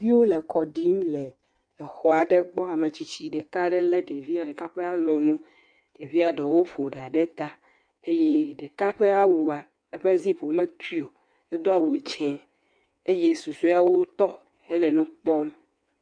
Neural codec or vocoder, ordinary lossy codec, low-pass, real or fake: codec, 16 kHz in and 24 kHz out, 1.1 kbps, FireRedTTS-2 codec; MP3, 64 kbps; 9.9 kHz; fake